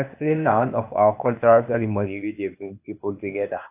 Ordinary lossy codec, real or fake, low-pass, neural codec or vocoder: AAC, 32 kbps; fake; 3.6 kHz; codec, 16 kHz, 0.8 kbps, ZipCodec